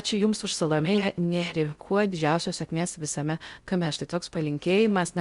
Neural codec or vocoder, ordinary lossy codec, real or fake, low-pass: codec, 16 kHz in and 24 kHz out, 0.6 kbps, FocalCodec, streaming, 4096 codes; Opus, 64 kbps; fake; 10.8 kHz